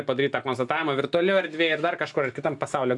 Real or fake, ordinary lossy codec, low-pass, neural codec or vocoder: real; AAC, 64 kbps; 10.8 kHz; none